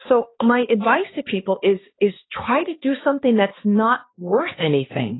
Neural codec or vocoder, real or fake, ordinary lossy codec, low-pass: codec, 16 kHz, 2 kbps, X-Codec, HuBERT features, trained on balanced general audio; fake; AAC, 16 kbps; 7.2 kHz